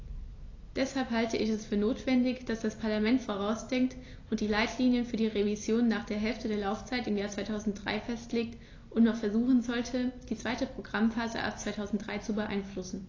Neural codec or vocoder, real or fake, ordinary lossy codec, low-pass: none; real; AAC, 32 kbps; 7.2 kHz